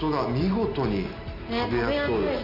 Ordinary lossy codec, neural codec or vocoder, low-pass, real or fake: none; none; 5.4 kHz; real